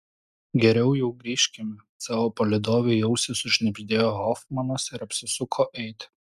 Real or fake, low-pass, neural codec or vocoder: real; 14.4 kHz; none